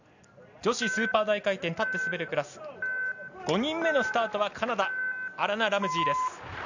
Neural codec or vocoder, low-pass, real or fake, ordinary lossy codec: none; 7.2 kHz; real; none